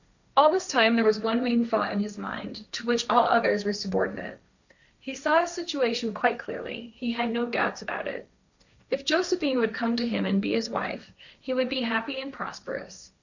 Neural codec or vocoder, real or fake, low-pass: codec, 16 kHz, 1.1 kbps, Voila-Tokenizer; fake; 7.2 kHz